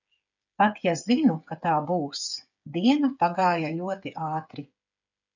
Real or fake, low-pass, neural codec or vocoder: fake; 7.2 kHz; codec, 16 kHz, 8 kbps, FreqCodec, smaller model